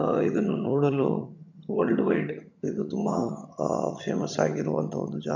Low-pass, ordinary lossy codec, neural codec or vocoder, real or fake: 7.2 kHz; none; vocoder, 22.05 kHz, 80 mel bands, HiFi-GAN; fake